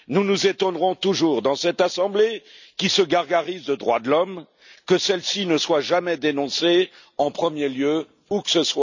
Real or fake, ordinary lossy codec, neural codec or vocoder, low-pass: real; none; none; 7.2 kHz